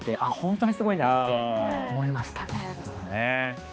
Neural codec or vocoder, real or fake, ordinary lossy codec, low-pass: codec, 16 kHz, 2 kbps, X-Codec, HuBERT features, trained on balanced general audio; fake; none; none